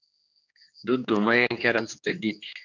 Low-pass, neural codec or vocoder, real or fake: 7.2 kHz; codec, 16 kHz, 2 kbps, X-Codec, HuBERT features, trained on general audio; fake